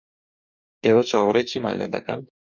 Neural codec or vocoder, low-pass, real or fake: codec, 44.1 kHz, 2.6 kbps, DAC; 7.2 kHz; fake